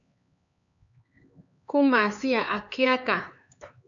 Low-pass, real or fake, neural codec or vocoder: 7.2 kHz; fake; codec, 16 kHz, 4 kbps, X-Codec, HuBERT features, trained on LibriSpeech